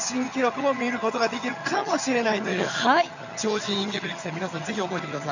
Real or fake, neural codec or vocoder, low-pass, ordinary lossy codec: fake; vocoder, 22.05 kHz, 80 mel bands, HiFi-GAN; 7.2 kHz; none